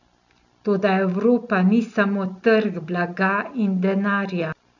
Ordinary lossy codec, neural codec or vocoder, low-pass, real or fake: none; none; 7.2 kHz; real